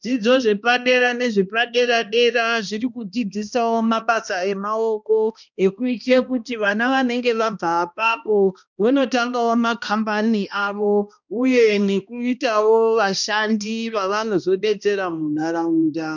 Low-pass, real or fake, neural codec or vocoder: 7.2 kHz; fake; codec, 16 kHz, 1 kbps, X-Codec, HuBERT features, trained on balanced general audio